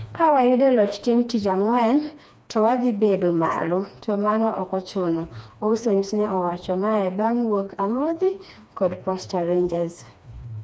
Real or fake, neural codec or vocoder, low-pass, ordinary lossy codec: fake; codec, 16 kHz, 2 kbps, FreqCodec, smaller model; none; none